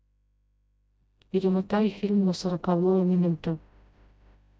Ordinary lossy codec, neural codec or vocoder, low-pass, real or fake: none; codec, 16 kHz, 0.5 kbps, FreqCodec, smaller model; none; fake